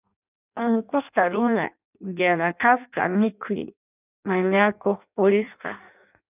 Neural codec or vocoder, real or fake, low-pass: codec, 16 kHz in and 24 kHz out, 0.6 kbps, FireRedTTS-2 codec; fake; 3.6 kHz